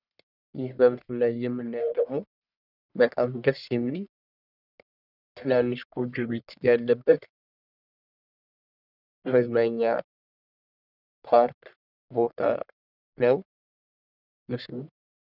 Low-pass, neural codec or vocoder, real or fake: 5.4 kHz; codec, 44.1 kHz, 1.7 kbps, Pupu-Codec; fake